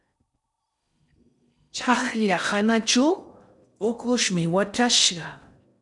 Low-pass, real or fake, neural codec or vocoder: 10.8 kHz; fake; codec, 16 kHz in and 24 kHz out, 0.6 kbps, FocalCodec, streaming, 4096 codes